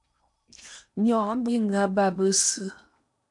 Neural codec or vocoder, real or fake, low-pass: codec, 16 kHz in and 24 kHz out, 0.8 kbps, FocalCodec, streaming, 65536 codes; fake; 10.8 kHz